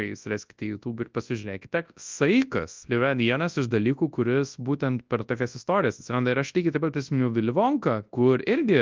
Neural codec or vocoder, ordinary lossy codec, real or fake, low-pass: codec, 24 kHz, 0.9 kbps, WavTokenizer, large speech release; Opus, 24 kbps; fake; 7.2 kHz